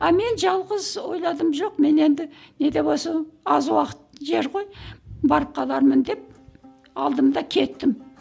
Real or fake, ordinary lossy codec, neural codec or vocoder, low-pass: real; none; none; none